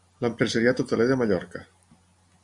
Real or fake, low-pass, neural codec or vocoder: real; 10.8 kHz; none